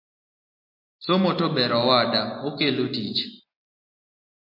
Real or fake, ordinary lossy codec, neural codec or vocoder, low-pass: real; MP3, 32 kbps; none; 5.4 kHz